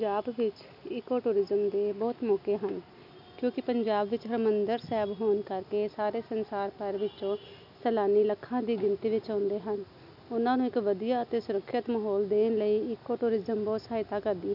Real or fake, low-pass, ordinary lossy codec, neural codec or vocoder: real; 5.4 kHz; none; none